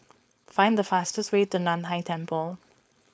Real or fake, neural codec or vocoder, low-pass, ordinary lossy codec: fake; codec, 16 kHz, 4.8 kbps, FACodec; none; none